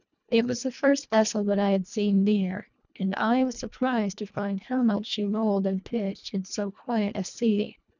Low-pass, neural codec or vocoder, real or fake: 7.2 kHz; codec, 24 kHz, 1.5 kbps, HILCodec; fake